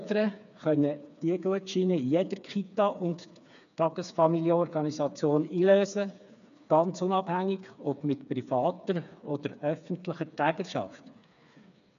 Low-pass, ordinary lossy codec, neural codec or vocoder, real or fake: 7.2 kHz; none; codec, 16 kHz, 4 kbps, FreqCodec, smaller model; fake